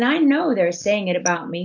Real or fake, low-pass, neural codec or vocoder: real; 7.2 kHz; none